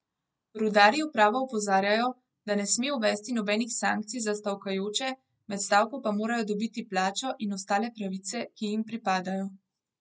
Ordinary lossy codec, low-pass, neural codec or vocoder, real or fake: none; none; none; real